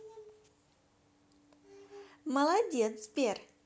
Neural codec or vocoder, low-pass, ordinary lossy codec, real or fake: none; none; none; real